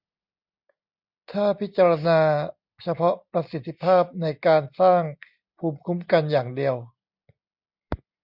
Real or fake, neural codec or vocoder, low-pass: real; none; 5.4 kHz